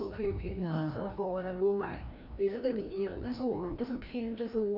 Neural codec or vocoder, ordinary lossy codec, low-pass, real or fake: codec, 16 kHz, 1 kbps, FreqCodec, larger model; none; 5.4 kHz; fake